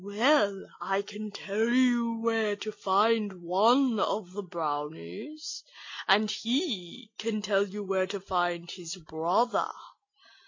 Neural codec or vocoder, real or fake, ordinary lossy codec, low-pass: none; real; MP3, 48 kbps; 7.2 kHz